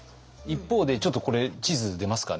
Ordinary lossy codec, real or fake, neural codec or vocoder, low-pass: none; real; none; none